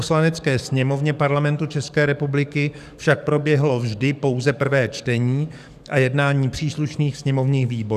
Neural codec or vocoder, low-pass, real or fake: codec, 44.1 kHz, 7.8 kbps, DAC; 14.4 kHz; fake